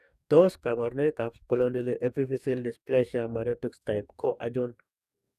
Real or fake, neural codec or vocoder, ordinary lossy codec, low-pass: fake; codec, 44.1 kHz, 2.6 kbps, DAC; none; 14.4 kHz